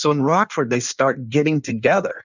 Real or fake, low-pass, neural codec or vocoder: fake; 7.2 kHz; codec, 16 kHz in and 24 kHz out, 1.1 kbps, FireRedTTS-2 codec